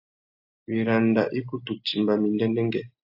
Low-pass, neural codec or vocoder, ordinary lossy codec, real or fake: 5.4 kHz; none; AAC, 48 kbps; real